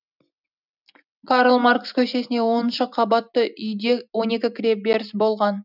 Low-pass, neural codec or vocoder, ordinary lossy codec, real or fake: 5.4 kHz; none; none; real